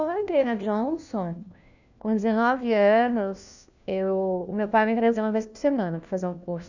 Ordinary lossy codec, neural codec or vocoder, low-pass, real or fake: none; codec, 16 kHz, 1 kbps, FunCodec, trained on LibriTTS, 50 frames a second; 7.2 kHz; fake